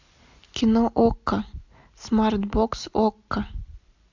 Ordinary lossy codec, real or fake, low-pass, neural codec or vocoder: AAC, 48 kbps; real; 7.2 kHz; none